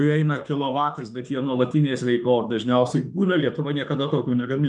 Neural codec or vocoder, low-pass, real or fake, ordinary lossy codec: autoencoder, 48 kHz, 32 numbers a frame, DAC-VAE, trained on Japanese speech; 10.8 kHz; fake; MP3, 96 kbps